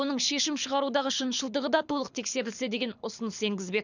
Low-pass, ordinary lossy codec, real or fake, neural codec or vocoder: 7.2 kHz; none; fake; codec, 24 kHz, 6 kbps, HILCodec